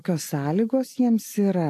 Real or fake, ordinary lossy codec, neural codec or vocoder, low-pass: real; AAC, 64 kbps; none; 14.4 kHz